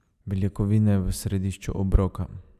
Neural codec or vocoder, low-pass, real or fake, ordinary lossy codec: none; 14.4 kHz; real; none